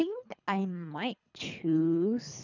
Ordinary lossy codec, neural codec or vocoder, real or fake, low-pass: none; codec, 24 kHz, 3 kbps, HILCodec; fake; 7.2 kHz